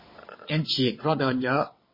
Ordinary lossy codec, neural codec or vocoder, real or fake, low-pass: MP3, 24 kbps; vocoder, 22.05 kHz, 80 mel bands, WaveNeXt; fake; 5.4 kHz